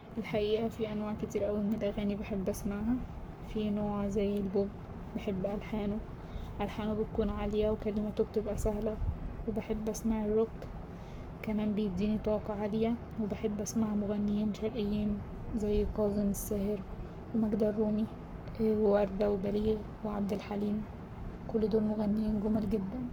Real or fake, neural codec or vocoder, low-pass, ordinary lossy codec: fake; codec, 44.1 kHz, 7.8 kbps, Pupu-Codec; none; none